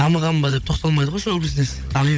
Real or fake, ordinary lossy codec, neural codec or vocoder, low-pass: fake; none; codec, 16 kHz, 16 kbps, FunCodec, trained on Chinese and English, 50 frames a second; none